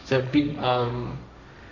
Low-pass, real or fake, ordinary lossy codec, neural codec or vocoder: 7.2 kHz; fake; none; codec, 16 kHz, 1.1 kbps, Voila-Tokenizer